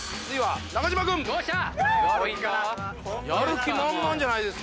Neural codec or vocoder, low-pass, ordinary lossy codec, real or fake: none; none; none; real